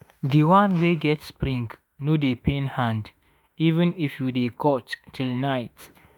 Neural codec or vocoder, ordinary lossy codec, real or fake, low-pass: autoencoder, 48 kHz, 32 numbers a frame, DAC-VAE, trained on Japanese speech; none; fake; 19.8 kHz